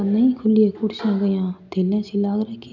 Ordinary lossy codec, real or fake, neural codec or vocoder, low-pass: none; real; none; 7.2 kHz